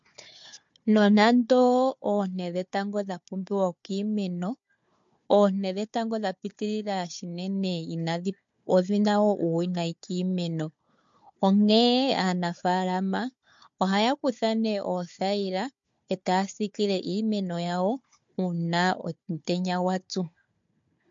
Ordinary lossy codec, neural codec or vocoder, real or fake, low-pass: MP3, 48 kbps; codec, 16 kHz, 4 kbps, FunCodec, trained on Chinese and English, 50 frames a second; fake; 7.2 kHz